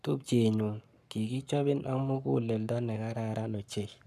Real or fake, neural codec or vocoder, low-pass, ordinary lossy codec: fake; codec, 44.1 kHz, 7.8 kbps, Pupu-Codec; 14.4 kHz; none